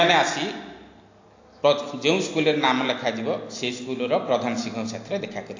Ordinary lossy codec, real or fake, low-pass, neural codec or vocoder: AAC, 48 kbps; real; 7.2 kHz; none